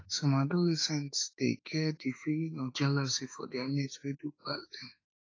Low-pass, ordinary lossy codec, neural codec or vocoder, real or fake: 7.2 kHz; AAC, 32 kbps; autoencoder, 48 kHz, 32 numbers a frame, DAC-VAE, trained on Japanese speech; fake